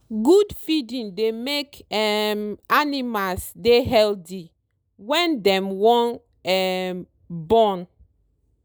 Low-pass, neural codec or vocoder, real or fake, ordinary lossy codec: none; none; real; none